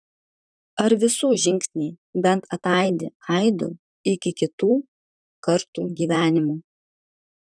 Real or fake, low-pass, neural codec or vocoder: fake; 9.9 kHz; vocoder, 44.1 kHz, 128 mel bands, Pupu-Vocoder